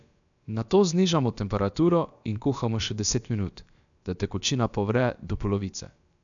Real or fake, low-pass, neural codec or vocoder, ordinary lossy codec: fake; 7.2 kHz; codec, 16 kHz, about 1 kbps, DyCAST, with the encoder's durations; none